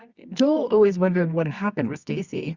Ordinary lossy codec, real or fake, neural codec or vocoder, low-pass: Opus, 64 kbps; fake; codec, 24 kHz, 0.9 kbps, WavTokenizer, medium music audio release; 7.2 kHz